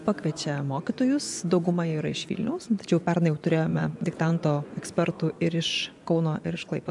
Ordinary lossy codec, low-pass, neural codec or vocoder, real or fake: MP3, 96 kbps; 10.8 kHz; vocoder, 24 kHz, 100 mel bands, Vocos; fake